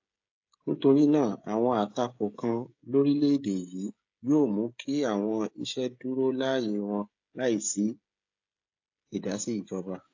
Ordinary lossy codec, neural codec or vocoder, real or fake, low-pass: AAC, 48 kbps; codec, 16 kHz, 16 kbps, FreqCodec, smaller model; fake; 7.2 kHz